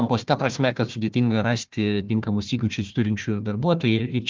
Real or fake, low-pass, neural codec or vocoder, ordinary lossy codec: fake; 7.2 kHz; codec, 16 kHz, 1 kbps, FunCodec, trained on Chinese and English, 50 frames a second; Opus, 32 kbps